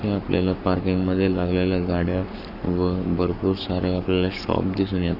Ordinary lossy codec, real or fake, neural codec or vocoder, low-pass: none; fake; codec, 44.1 kHz, 7.8 kbps, Pupu-Codec; 5.4 kHz